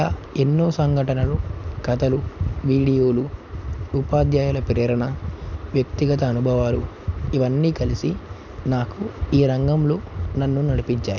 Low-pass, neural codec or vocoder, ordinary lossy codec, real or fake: 7.2 kHz; none; none; real